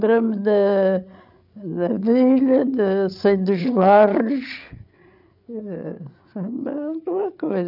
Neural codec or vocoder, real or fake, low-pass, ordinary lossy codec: codec, 16 kHz, 8 kbps, FreqCodec, larger model; fake; 5.4 kHz; none